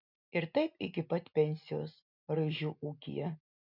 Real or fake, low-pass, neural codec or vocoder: real; 5.4 kHz; none